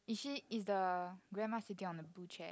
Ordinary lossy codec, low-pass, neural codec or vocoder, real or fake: none; none; none; real